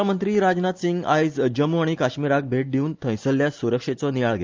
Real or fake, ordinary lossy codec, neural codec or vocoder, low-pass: real; Opus, 24 kbps; none; 7.2 kHz